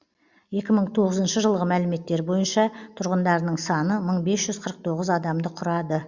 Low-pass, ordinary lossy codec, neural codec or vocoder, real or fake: 7.2 kHz; Opus, 64 kbps; none; real